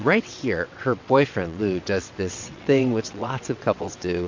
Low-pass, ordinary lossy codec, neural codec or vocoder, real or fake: 7.2 kHz; MP3, 48 kbps; vocoder, 22.05 kHz, 80 mel bands, WaveNeXt; fake